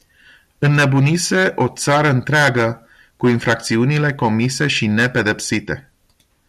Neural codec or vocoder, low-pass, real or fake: none; 14.4 kHz; real